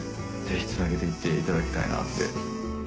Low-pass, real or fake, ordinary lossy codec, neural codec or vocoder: none; real; none; none